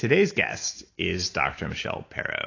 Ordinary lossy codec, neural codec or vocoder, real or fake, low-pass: AAC, 32 kbps; none; real; 7.2 kHz